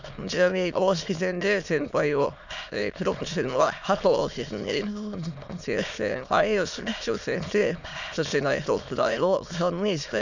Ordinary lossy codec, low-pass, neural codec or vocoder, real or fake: none; 7.2 kHz; autoencoder, 22.05 kHz, a latent of 192 numbers a frame, VITS, trained on many speakers; fake